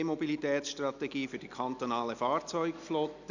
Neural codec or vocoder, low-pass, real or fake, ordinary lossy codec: none; 7.2 kHz; real; none